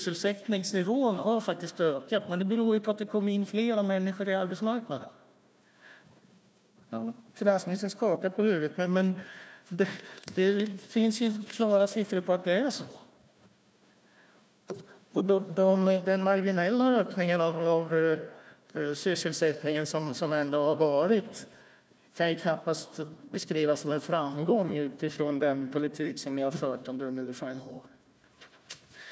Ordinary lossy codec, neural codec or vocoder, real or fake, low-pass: none; codec, 16 kHz, 1 kbps, FunCodec, trained on Chinese and English, 50 frames a second; fake; none